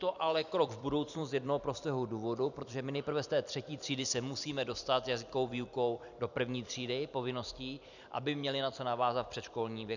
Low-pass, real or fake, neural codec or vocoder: 7.2 kHz; fake; vocoder, 44.1 kHz, 128 mel bands every 256 samples, BigVGAN v2